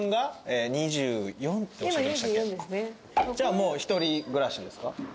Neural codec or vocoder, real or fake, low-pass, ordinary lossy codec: none; real; none; none